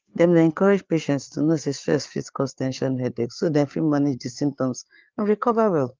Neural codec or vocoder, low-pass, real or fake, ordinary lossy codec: codec, 16 kHz, 4 kbps, FreqCodec, larger model; 7.2 kHz; fake; Opus, 32 kbps